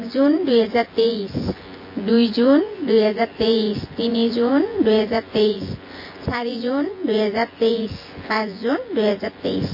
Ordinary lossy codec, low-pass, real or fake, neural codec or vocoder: MP3, 24 kbps; 5.4 kHz; fake; vocoder, 24 kHz, 100 mel bands, Vocos